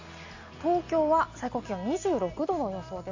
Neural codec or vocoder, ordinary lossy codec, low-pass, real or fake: none; AAC, 48 kbps; 7.2 kHz; real